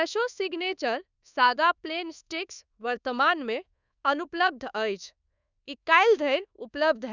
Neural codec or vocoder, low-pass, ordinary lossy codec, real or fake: codec, 24 kHz, 1.2 kbps, DualCodec; 7.2 kHz; none; fake